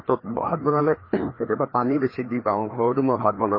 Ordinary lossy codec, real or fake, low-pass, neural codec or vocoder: MP3, 24 kbps; fake; 5.4 kHz; codec, 16 kHz, 2 kbps, FreqCodec, larger model